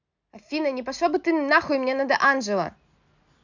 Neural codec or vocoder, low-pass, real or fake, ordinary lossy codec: none; 7.2 kHz; real; none